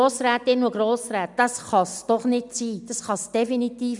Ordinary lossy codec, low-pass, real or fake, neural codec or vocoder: none; 10.8 kHz; real; none